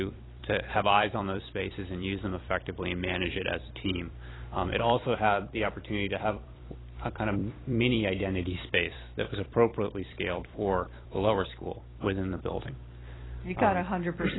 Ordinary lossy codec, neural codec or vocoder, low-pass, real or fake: AAC, 16 kbps; vocoder, 44.1 kHz, 128 mel bands every 256 samples, BigVGAN v2; 7.2 kHz; fake